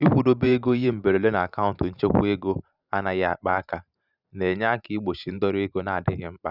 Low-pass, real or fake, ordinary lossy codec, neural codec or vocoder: 5.4 kHz; fake; none; vocoder, 44.1 kHz, 128 mel bands every 512 samples, BigVGAN v2